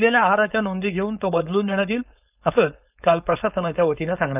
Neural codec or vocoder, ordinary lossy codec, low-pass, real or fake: codec, 16 kHz, 4.8 kbps, FACodec; none; 3.6 kHz; fake